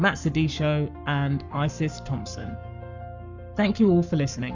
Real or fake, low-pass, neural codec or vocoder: fake; 7.2 kHz; codec, 44.1 kHz, 7.8 kbps, Pupu-Codec